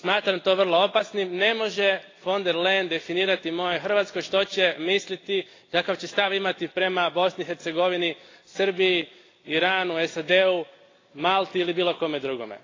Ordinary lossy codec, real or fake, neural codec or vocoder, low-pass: AAC, 32 kbps; real; none; 7.2 kHz